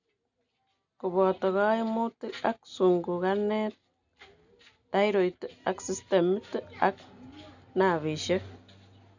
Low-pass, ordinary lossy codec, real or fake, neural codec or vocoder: 7.2 kHz; none; real; none